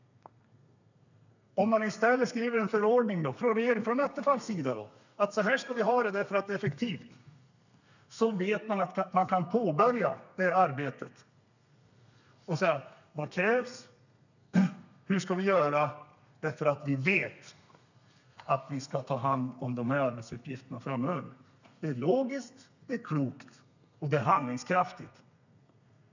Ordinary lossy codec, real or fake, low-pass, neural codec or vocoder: none; fake; 7.2 kHz; codec, 32 kHz, 1.9 kbps, SNAC